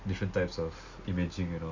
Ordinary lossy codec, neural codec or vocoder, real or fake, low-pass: Opus, 64 kbps; none; real; 7.2 kHz